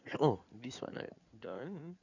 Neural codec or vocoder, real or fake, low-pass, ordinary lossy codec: codec, 16 kHz in and 24 kHz out, 2.2 kbps, FireRedTTS-2 codec; fake; 7.2 kHz; none